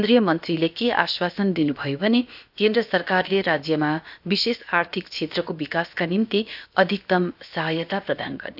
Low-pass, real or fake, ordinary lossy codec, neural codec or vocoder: 5.4 kHz; fake; AAC, 48 kbps; codec, 16 kHz, about 1 kbps, DyCAST, with the encoder's durations